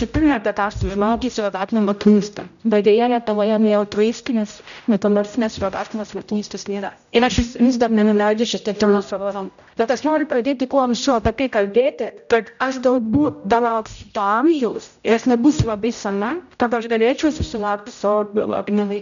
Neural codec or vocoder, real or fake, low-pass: codec, 16 kHz, 0.5 kbps, X-Codec, HuBERT features, trained on general audio; fake; 7.2 kHz